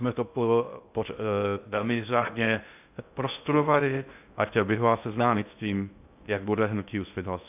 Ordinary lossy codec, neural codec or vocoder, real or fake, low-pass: AAC, 32 kbps; codec, 16 kHz in and 24 kHz out, 0.6 kbps, FocalCodec, streaming, 2048 codes; fake; 3.6 kHz